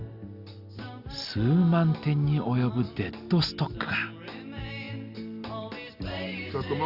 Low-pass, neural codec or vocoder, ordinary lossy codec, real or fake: 5.4 kHz; none; Opus, 64 kbps; real